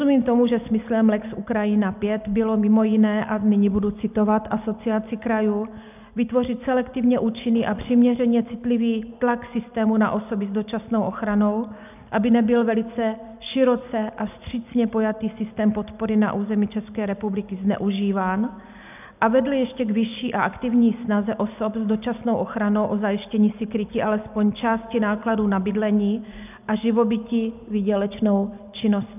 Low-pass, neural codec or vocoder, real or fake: 3.6 kHz; none; real